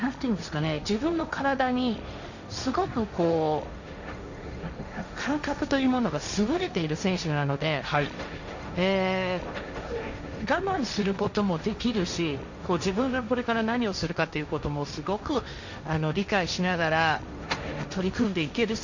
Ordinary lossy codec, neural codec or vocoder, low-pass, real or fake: none; codec, 16 kHz, 1.1 kbps, Voila-Tokenizer; 7.2 kHz; fake